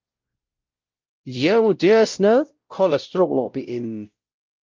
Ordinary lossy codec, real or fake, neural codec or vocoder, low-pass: Opus, 24 kbps; fake; codec, 16 kHz, 0.5 kbps, X-Codec, WavLM features, trained on Multilingual LibriSpeech; 7.2 kHz